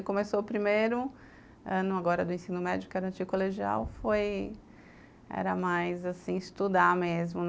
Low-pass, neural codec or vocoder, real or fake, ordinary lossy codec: none; none; real; none